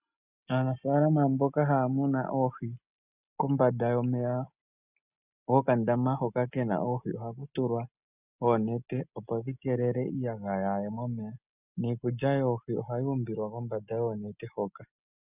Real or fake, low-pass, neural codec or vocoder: real; 3.6 kHz; none